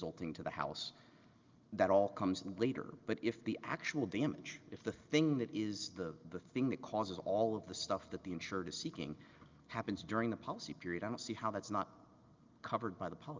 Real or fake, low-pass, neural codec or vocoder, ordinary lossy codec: real; 7.2 kHz; none; Opus, 24 kbps